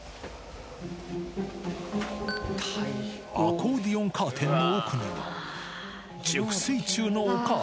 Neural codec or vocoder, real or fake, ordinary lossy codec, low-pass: none; real; none; none